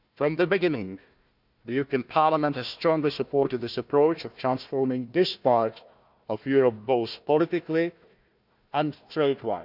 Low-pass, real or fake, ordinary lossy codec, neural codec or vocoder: 5.4 kHz; fake; none; codec, 16 kHz, 1 kbps, FunCodec, trained on Chinese and English, 50 frames a second